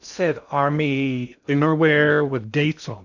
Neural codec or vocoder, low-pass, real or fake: codec, 16 kHz in and 24 kHz out, 0.6 kbps, FocalCodec, streaming, 2048 codes; 7.2 kHz; fake